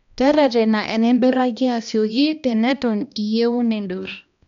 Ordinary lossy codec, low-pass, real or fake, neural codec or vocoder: none; 7.2 kHz; fake; codec, 16 kHz, 1 kbps, X-Codec, HuBERT features, trained on balanced general audio